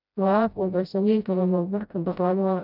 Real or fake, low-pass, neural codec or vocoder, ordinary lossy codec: fake; 5.4 kHz; codec, 16 kHz, 0.5 kbps, FreqCodec, smaller model; none